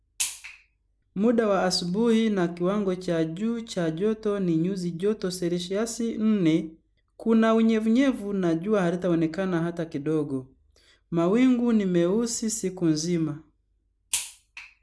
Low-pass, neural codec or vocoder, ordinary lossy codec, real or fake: none; none; none; real